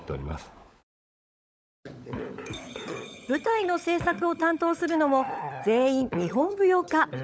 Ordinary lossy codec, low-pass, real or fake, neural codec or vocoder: none; none; fake; codec, 16 kHz, 16 kbps, FunCodec, trained on LibriTTS, 50 frames a second